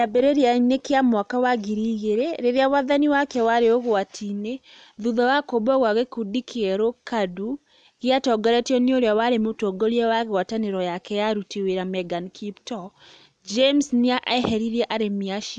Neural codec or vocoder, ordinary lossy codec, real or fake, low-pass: none; none; real; 9.9 kHz